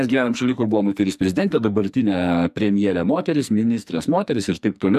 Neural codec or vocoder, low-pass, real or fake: codec, 44.1 kHz, 2.6 kbps, SNAC; 14.4 kHz; fake